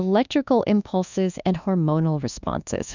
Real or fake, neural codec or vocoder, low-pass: fake; codec, 24 kHz, 1.2 kbps, DualCodec; 7.2 kHz